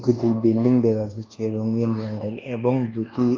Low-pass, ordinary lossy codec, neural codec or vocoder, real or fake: 7.2 kHz; Opus, 32 kbps; codec, 24 kHz, 1.2 kbps, DualCodec; fake